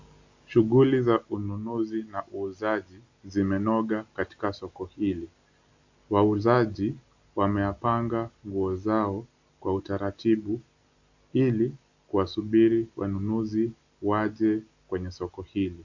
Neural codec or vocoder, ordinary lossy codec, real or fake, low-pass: none; AAC, 48 kbps; real; 7.2 kHz